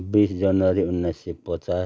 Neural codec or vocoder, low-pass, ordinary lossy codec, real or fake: none; none; none; real